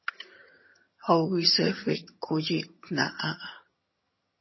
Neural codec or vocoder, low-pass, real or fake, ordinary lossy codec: vocoder, 22.05 kHz, 80 mel bands, HiFi-GAN; 7.2 kHz; fake; MP3, 24 kbps